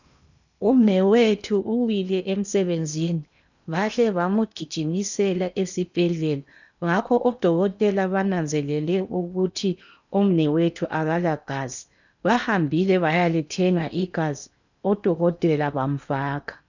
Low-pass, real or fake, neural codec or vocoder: 7.2 kHz; fake; codec, 16 kHz in and 24 kHz out, 0.8 kbps, FocalCodec, streaming, 65536 codes